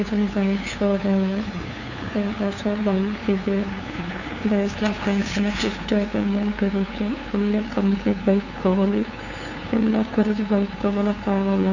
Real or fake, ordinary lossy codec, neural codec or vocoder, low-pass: fake; none; codec, 16 kHz, 2 kbps, FunCodec, trained on LibriTTS, 25 frames a second; 7.2 kHz